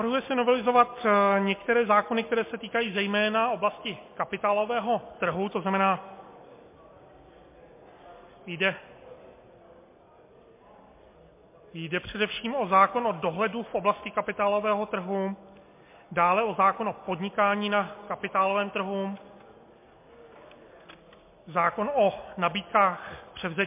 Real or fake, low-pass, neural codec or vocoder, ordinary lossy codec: real; 3.6 kHz; none; MP3, 24 kbps